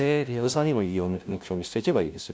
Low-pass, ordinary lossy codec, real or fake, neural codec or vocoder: none; none; fake; codec, 16 kHz, 0.5 kbps, FunCodec, trained on LibriTTS, 25 frames a second